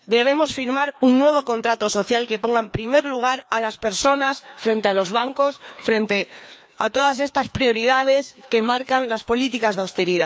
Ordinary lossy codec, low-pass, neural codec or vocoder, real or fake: none; none; codec, 16 kHz, 2 kbps, FreqCodec, larger model; fake